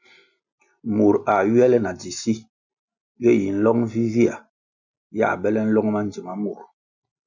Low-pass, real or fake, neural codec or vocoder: 7.2 kHz; real; none